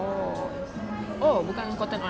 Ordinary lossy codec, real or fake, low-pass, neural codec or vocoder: none; real; none; none